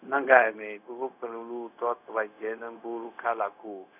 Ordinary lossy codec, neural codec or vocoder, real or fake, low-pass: none; codec, 16 kHz, 0.4 kbps, LongCat-Audio-Codec; fake; 3.6 kHz